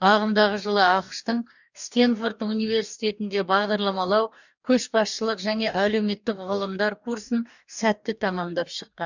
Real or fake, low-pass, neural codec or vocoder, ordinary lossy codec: fake; 7.2 kHz; codec, 44.1 kHz, 2.6 kbps, DAC; none